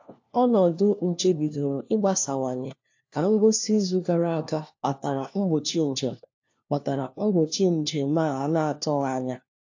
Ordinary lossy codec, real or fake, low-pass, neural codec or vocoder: AAC, 48 kbps; fake; 7.2 kHz; codec, 16 kHz, 1 kbps, FunCodec, trained on LibriTTS, 50 frames a second